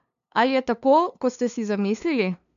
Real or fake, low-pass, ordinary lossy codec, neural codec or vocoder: fake; 7.2 kHz; none; codec, 16 kHz, 2 kbps, FunCodec, trained on LibriTTS, 25 frames a second